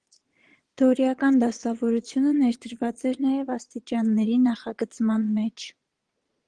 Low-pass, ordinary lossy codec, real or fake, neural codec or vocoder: 9.9 kHz; Opus, 16 kbps; fake; vocoder, 22.05 kHz, 80 mel bands, Vocos